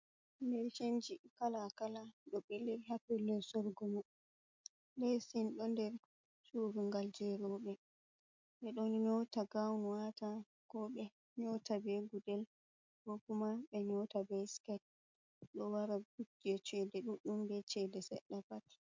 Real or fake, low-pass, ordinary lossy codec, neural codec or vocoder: real; 7.2 kHz; MP3, 64 kbps; none